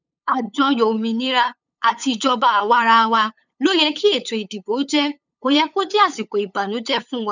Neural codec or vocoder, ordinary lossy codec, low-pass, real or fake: codec, 16 kHz, 8 kbps, FunCodec, trained on LibriTTS, 25 frames a second; none; 7.2 kHz; fake